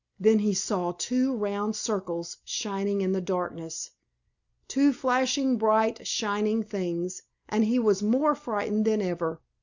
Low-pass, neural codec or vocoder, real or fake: 7.2 kHz; none; real